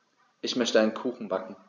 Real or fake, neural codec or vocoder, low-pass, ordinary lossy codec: real; none; 7.2 kHz; none